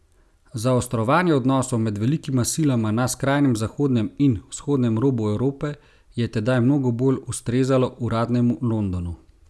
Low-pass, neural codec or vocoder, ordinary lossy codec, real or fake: none; none; none; real